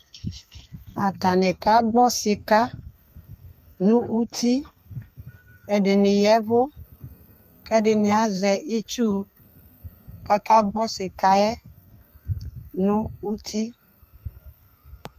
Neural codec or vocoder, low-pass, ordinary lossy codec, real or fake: codec, 32 kHz, 1.9 kbps, SNAC; 14.4 kHz; MP3, 96 kbps; fake